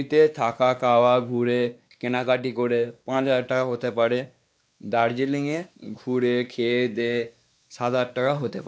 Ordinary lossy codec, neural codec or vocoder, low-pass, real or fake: none; codec, 16 kHz, 2 kbps, X-Codec, WavLM features, trained on Multilingual LibriSpeech; none; fake